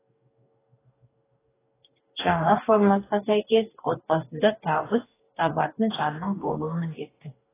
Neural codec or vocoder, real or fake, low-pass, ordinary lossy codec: codec, 44.1 kHz, 2.6 kbps, DAC; fake; 3.6 kHz; AAC, 16 kbps